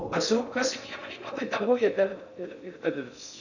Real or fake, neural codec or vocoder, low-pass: fake; codec, 16 kHz in and 24 kHz out, 0.6 kbps, FocalCodec, streaming, 4096 codes; 7.2 kHz